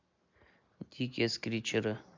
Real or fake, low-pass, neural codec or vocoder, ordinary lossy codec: real; 7.2 kHz; none; MP3, 64 kbps